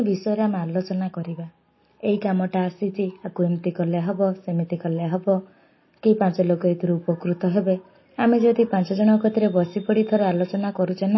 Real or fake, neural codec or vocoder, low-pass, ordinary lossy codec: real; none; 7.2 kHz; MP3, 24 kbps